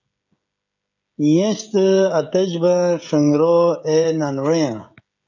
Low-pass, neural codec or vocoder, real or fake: 7.2 kHz; codec, 16 kHz, 16 kbps, FreqCodec, smaller model; fake